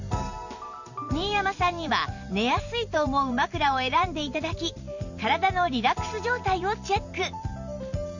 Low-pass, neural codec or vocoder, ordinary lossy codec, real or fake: 7.2 kHz; vocoder, 44.1 kHz, 128 mel bands every 512 samples, BigVGAN v2; none; fake